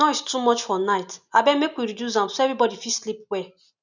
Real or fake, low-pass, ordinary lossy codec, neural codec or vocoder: real; 7.2 kHz; none; none